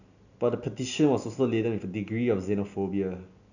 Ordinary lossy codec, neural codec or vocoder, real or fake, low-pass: none; none; real; 7.2 kHz